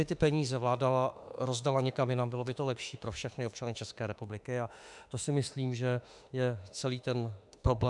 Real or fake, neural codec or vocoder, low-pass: fake; autoencoder, 48 kHz, 32 numbers a frame, DAC-VAE, trained on Japanese speech; 10.8 kHz